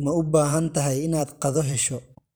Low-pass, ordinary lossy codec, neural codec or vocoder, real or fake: none; none; vocoder, 44.1 kHz, 128 mel bands every 512 samples, BigVGAN v2; fake